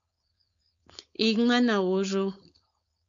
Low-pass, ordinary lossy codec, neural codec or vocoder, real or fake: 7.2 kHz; AAC, 64 kbps; codec, 16 kHz, 4.8 kbps, FACodec; fake